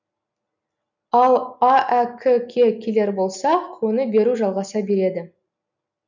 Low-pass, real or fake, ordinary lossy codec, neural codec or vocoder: 7.2 kHz; real; none; none